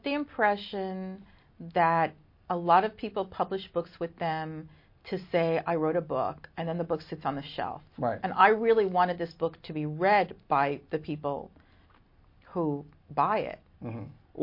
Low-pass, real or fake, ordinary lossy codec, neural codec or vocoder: 5.4 kHz; real; MP3, 32 kbps; none